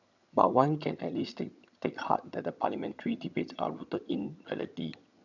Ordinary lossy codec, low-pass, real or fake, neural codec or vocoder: none; 7.2 kHz; fake; vocoder, 22.05 kHz, 80 mel bands, HiFi-GAN